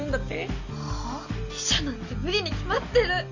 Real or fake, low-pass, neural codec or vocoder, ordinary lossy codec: real; 7.2 kHz; none; Opus, 64 kbps